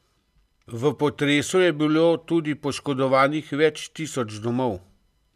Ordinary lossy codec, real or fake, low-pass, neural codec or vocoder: none; real; 14.4 kHz; none